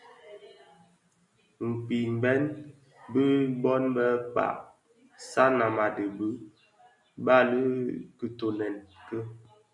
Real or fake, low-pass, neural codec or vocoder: real; 10.8 kHz; none